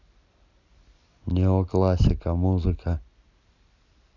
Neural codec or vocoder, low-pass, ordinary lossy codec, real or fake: none; 7.2 kHz; none; real